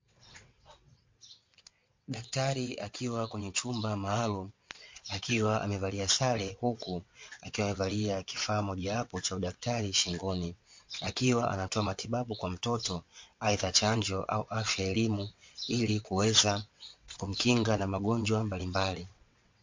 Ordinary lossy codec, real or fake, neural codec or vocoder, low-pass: MP3, 48 kbps; fake; vocoder, 22.05 kHz, 80 mel bands, WaveNeXt; 7.2 kHz